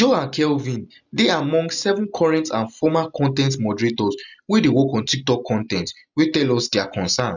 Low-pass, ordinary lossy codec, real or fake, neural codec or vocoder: 7.2 kHz; none; real; none